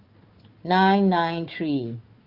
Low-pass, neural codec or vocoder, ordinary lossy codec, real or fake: 5.4 kHz; codec, 16 kHz, 4 kbps, FunCodec, trained on Chinese and English, 50 frames a second; Opus, 32 kbps; fake